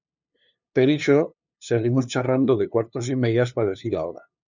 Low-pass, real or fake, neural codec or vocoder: 7.2 kHz; fake; codec, 16 kHz, 2 kbps, FunCodec, trained on LibriTTS, 25 frames a second